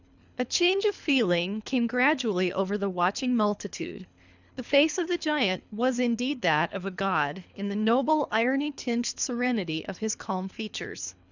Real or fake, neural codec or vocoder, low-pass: fake; codec, 24 kHz, 3 kbps, HILCodec; 7.2 kHz